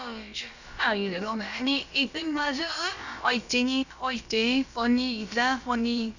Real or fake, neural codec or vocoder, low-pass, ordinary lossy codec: fake; codec, 16 kHz, about 1 kbps, DyCAST, with the encoder's durations; 7.2 kHz; none